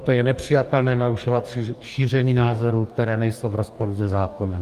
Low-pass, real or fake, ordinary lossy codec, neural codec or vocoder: 14.4 kHz; fake; Opus, 32 kbps; codec, 44.1 kHz, 2.6 kbps, DAC